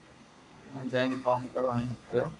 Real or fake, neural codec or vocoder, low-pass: fake; codec, 32 kHz, 1.9 kbps, SNAC; 10.8 kHz